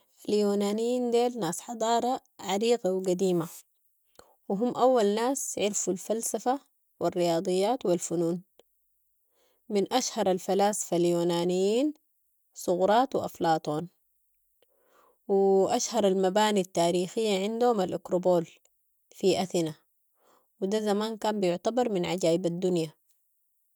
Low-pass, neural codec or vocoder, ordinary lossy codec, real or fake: none; none; none; real